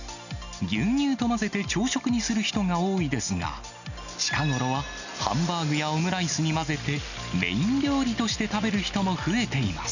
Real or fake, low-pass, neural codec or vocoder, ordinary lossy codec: real; 7.2 kHz; none; none